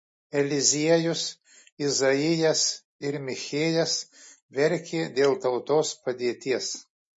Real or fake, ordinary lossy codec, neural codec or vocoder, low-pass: real; MP3, 32 kbps; none; 10.8 kHz